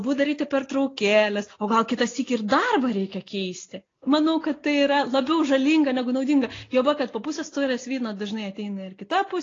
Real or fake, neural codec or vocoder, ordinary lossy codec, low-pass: real; none; AAC, 32 kbps; 7.2 kHz